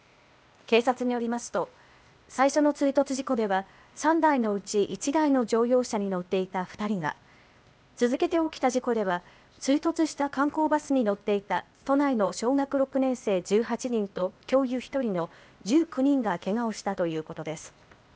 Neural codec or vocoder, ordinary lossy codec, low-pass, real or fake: codec, 16 kHz, 0.8 kbps, ZipCodec; none; none; fake